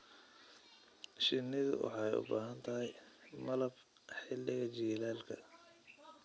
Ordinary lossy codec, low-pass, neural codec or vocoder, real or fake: none; none; none; real